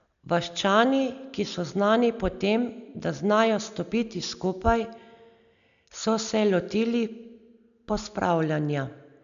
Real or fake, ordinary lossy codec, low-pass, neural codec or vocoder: real; none; 7.2 kHz; none